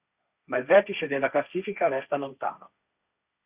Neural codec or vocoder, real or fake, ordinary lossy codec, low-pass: codec, 16 kHz, 1.1 kbps, Voila-Tokenizer; fake; Opus, 64 kbps; 3.6 kHz